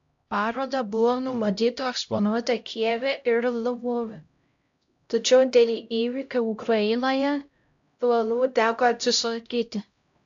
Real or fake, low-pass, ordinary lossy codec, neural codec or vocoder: fake; 7.2 kHz; AAC, 64 kbps; codec, 16 kHz, 0.5 kbps, X-Codec, HuBERT features, trained on LibriSpeech